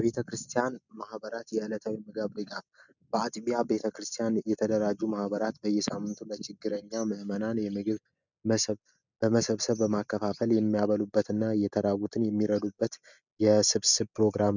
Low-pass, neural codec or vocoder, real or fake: 7.2 kHz; none; real